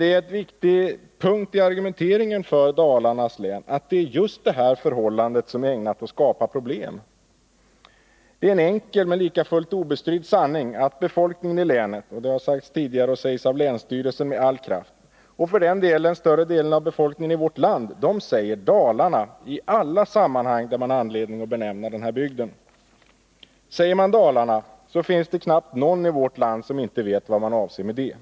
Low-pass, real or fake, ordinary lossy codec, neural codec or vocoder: none; real; none; none